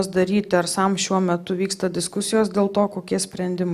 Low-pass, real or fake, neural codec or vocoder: 14.4 kHz; real; none